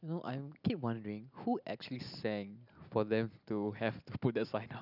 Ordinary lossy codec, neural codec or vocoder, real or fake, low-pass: none; none; real; 5.4 kHz